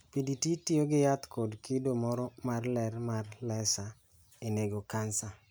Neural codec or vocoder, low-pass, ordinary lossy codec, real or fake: none; none; none; real